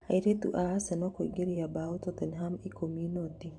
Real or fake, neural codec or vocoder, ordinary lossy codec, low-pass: fake; vocoder, 44.1 kHz, 128 mel bands every 512 samples, BigVGAN v2; AAC, 64 kbps; 10.8 kHz